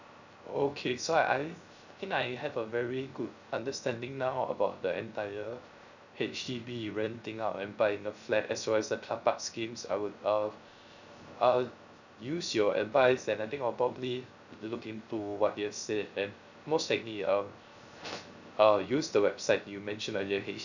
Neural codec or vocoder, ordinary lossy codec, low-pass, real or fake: codec, 16 kHz, 0.3 kbps, FocalCodec; none; 7.2 kHz; fake